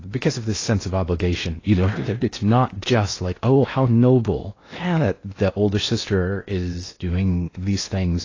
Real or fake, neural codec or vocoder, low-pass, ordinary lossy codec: fake; codec, 16 kHz in and 24 kHz out, 0.8 kbps, FocalCodec, streaming, 65536 codes; 7.2 kHz; AAC, 32 kbps